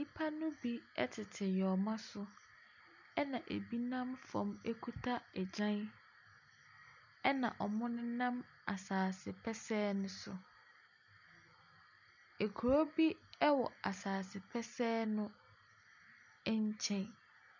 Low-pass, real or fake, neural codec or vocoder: 7.2 kHz; real; none